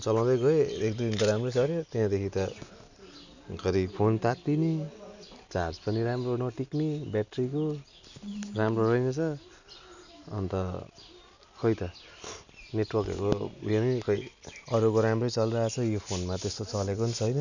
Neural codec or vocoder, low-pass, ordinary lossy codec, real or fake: none; 7.2 kHz; none; real